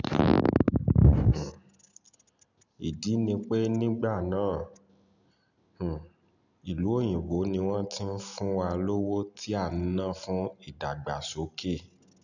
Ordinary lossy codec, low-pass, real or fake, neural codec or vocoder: none; 7.2 kHz; real; none